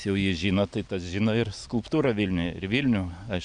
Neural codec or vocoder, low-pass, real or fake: none; 9.9 kHz; real